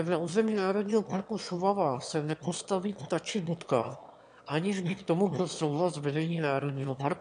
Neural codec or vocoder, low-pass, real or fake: autoencoder, 22.05 kHz, a latent of 192 numbers a frame, VITS, trained on one speaker; 9.9 kHz; fake